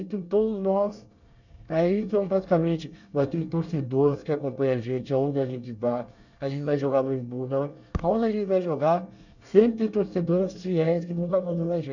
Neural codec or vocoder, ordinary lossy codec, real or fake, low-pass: codec, 24 kHz, 1 kbps, SNAC; none; fake; 7.2 kHz